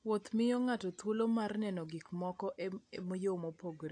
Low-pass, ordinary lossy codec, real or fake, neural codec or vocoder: 9.9 kHz; AAC, 48 kbps; real; none